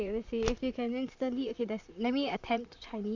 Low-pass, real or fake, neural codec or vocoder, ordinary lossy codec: 7.2 kHz; fake; vocoder, 44.1 kHz, 128 mel bands, Pupu-Vocoder; AAC, 48 kbps